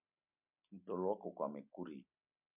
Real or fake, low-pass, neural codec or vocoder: real; 3.6 kHz; none